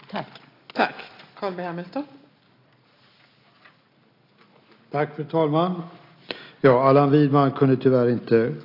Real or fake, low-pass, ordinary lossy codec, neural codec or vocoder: real; 5.4 kHz; AAC, 32 kbps; none